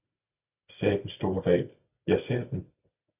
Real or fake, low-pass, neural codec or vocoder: real; 3.6 kHz; none